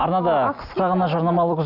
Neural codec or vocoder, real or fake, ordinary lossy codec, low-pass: none; real; none; 5.4 kHz